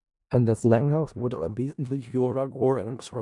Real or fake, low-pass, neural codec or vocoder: fake; 10.8 kHz; codec, 16 kHz in and 24 kHz out, 0.4 kbps, LongCat-Audio-Codec, four codebook decoder